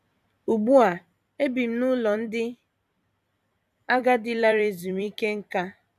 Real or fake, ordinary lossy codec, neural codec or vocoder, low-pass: fake; none; vocoder, 44.1 kHz, 128 mel bands every 256 samples, BigVGAN v2; 14.4 kHz